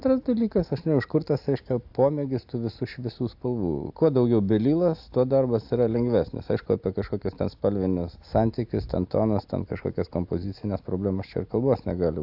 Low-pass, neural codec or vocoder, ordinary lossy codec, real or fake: 5.4 kHz; none; AAC, 48 kbps; real